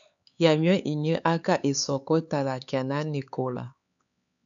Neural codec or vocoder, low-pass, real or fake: codec, 16 kHz, 4 kbps, X-Codec, HuBERT features, trained on LibriSpeech; 7.2 kHz; fake